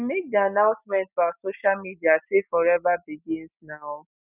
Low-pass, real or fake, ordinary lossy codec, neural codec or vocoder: 3.6 kHz; real; none; none